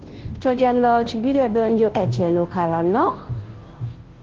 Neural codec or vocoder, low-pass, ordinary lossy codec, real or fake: codec, 16 kHz, 0.5 kbps, FunCodec, trained on Chinese and English, 25 frames a second; 7.2 kHz; Opus, 16 kbps; fake